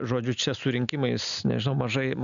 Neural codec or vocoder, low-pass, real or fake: none; 7.2 kHz; real